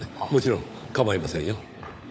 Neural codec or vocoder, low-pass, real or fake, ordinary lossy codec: codec, 16 kHz, 16 kbps, FunCodec, trained on LibriTTS, 50 frames a second; none; fake; none